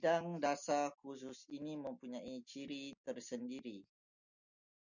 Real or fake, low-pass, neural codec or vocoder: real; 7.2 kHz; none